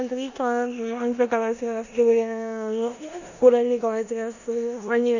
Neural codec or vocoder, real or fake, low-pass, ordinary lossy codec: codec, 16 kHz in and 24 kHz out, 0.9 kbps, LongCat-Audio-Codec, four codebook decoder; fake; 7.2 kHz; none